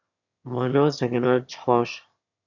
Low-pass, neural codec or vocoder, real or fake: 7.2 kHz; autoencoder, 22.05 kHz, a latent of 192 numbers a frame, VITS, trained on one speaker; fake